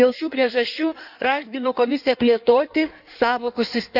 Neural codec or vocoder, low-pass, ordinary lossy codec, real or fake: codec, 16 kHz in and 24 kHz out, 1.1 kbps, FireRedTTS-2 codec; 5.4 kHz; none; fake